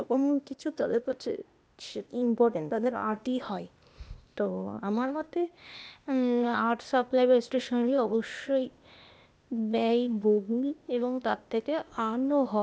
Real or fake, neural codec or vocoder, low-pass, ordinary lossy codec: fake; codec, 16 kHz, 0.8 kbps, ZipCodec; none; none